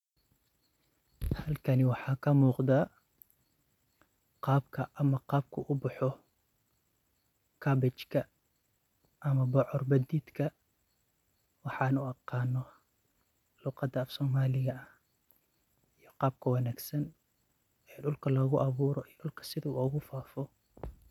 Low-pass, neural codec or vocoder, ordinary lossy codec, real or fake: 19.8 kHz; none; none; real